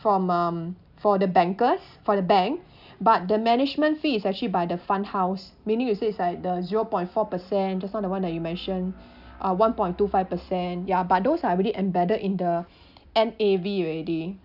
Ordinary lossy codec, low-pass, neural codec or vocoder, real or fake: none; 5.4 kHz; none; real